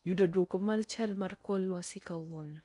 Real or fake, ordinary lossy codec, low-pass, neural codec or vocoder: fake; none; 10.8 kHz; codec, 16 kHz in and 24 kHz out, 0.6 kbps, FocalCodec, streaming, 4096 codes